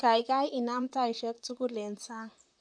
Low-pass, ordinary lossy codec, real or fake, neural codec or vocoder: 9.9 kHz; none; fake; vocoder, 44.1 kHz, 128 mel bands, Pupu-Vocoder